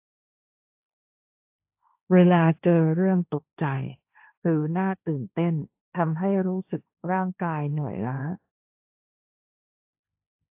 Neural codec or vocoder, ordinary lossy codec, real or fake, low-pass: codec, 16 kHz, 1.1 kbps, Voila-Tokenizer; AAC, 32 kbps; fake; 3.6 kHz